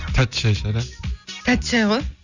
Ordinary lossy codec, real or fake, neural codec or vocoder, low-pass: none; real; none; 7.2 kHz